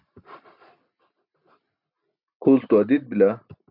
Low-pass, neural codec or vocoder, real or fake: 5.4 kHz; none; real